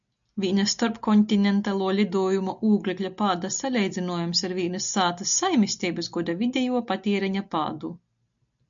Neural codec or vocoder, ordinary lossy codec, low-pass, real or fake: none; MP3, 64 kbps; 7.2 kHz; real